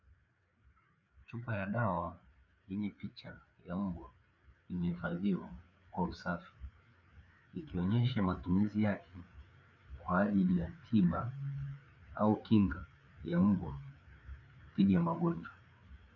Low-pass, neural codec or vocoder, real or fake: 7.2 kHz; codec, 16 kHz, 4 kbps, FreqCodec, larger model; fake